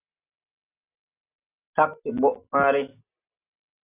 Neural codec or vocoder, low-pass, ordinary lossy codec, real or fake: none; 3.6 kHz; AAC, 32 kbps; real